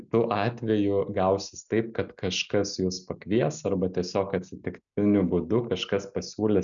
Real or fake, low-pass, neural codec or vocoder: real; 7.2 kHz; none